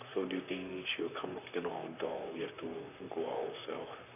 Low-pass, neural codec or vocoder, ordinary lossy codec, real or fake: 3.6 kHz; vocoder, 44.1 kHz, 128 mel bands, Pupu-Vocoder; none; fake